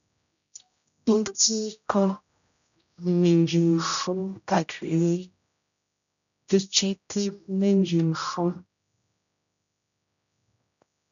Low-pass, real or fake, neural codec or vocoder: 7.2 kHz; fake; codec, 16 kHz, 0.5 kbps, X-Codec, HuBERT features, trained on general audio